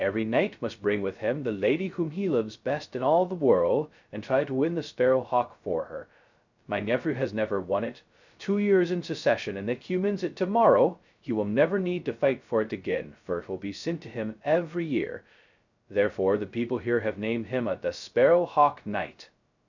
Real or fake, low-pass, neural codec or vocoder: fake; 7.2 kHz; codec, 16 kHz, 0.2 kbps, FocalCodec